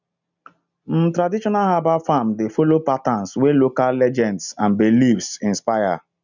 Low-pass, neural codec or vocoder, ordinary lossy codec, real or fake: 7.2 kHz; none; none; real